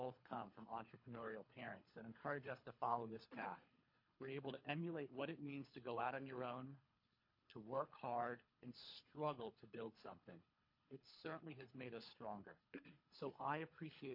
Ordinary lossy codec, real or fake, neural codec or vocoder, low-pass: MP3, 32 kbps; fake; codec, 24 kHz, 3 kbps, HILCodec; 5.4 kHz